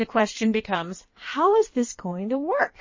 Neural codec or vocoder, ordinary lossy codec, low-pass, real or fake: codec, 16 kHz in and 24 kHz out, 1.1 kbps, FireRedTTS-2 codec; MP3, 32 kbps; 7.2 kHz; fake